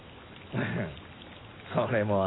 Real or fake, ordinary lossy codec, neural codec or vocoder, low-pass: real; AAC, 16 kbps; none; 7.2 kHz